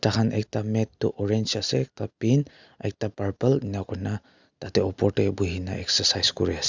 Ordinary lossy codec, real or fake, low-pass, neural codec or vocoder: Opus, 64 kbps; real; 7.2 kHz; none